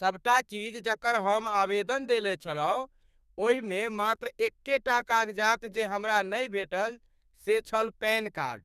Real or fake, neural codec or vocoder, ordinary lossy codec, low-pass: fake; codec, 32 kHz, 1.9 kbps, SNAC; none; 14.4 kHz